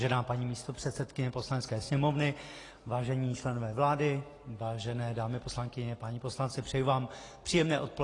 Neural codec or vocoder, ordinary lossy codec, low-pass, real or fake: none; AAC, 32 kbps; 10.8 kHz; real